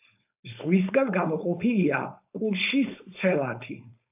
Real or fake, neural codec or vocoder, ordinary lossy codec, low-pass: fake; codec, 16 kHz, 4.8 kbps, FACodec; AAC, 32 kbps; 3.6 kHz